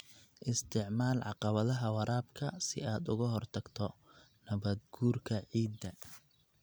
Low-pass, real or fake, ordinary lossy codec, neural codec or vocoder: none; real; none; none